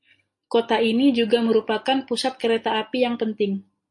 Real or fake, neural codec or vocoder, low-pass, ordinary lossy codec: real; none; 10.8 kHz; MP3, 48 kbps